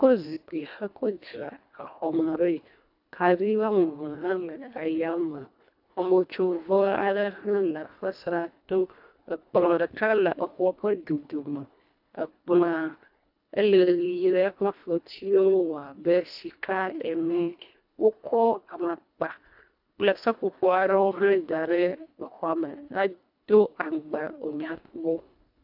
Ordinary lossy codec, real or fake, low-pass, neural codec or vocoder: AAC, 48 kbps; fake; 5.4 kHz; codec, 24 kHz, 1.5 kbps, HILCodec